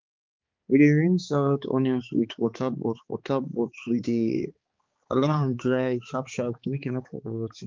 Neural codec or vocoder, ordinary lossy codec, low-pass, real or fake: codec, 16 kHz, 4 kbps, X-Codec, HuBERT features, trained on balanced general audio; Opus, 32 kbps; 7.2 kHz; fake